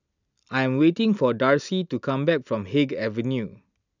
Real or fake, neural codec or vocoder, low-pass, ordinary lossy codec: real; none; 7.2 kHz; none